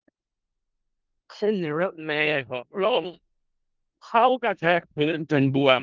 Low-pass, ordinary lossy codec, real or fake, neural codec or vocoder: 7.2 kHz; Opus, 32 kbps; fake; codec, 16 kHz in and 24 kHz out, 0.4 kbps, LongCat-Audio-Codec, four codebook decoder